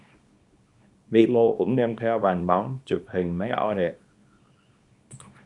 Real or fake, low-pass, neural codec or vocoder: fake; 10.8 kHz; codec, 24 kHz, 0.9 kbps, WavTokenizer, small release